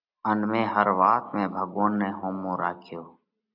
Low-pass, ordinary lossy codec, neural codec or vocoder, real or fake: 5.4 kHz; AAC, 48 kbps; none; real